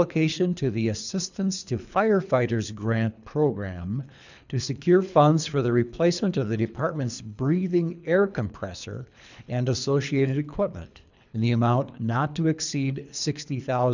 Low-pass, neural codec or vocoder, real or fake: 7.2 kHz; codec, 24 kHz, 3 kbps, HILCodec; fake